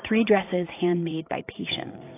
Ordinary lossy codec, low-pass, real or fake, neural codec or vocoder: AAC, 24 kbps; 3.6 kHz; fake; vocoder, 44.1 kHz, 80 mel bands, Vocos